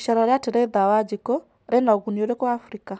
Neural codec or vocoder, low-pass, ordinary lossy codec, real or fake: none; none; none; real